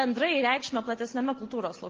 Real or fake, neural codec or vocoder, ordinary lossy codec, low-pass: real; none; Opus, 16 kbps; 7.2 kHz